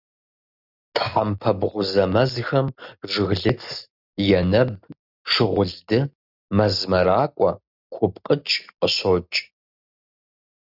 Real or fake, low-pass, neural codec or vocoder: real; 5.4 kHz; none